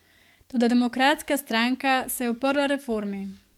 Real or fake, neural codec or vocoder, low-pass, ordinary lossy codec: fake; codec, 44.1 kHz, 7.8 kbps, DAC; 19.8 kHz; MP3, 96 kbps